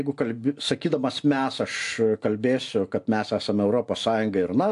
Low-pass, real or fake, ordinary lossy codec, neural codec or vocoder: 10.8 kHz; real; AAC, 64 kbps; none